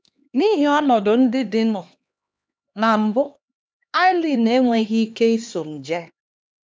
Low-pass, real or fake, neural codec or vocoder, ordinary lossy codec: none; fake; codec, 16 kHz, 2 kbps, X-Codec, HuBERT features, trained on LibriSpeech; none